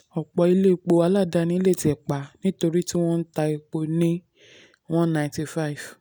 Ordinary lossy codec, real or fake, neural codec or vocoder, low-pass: none; real; none; none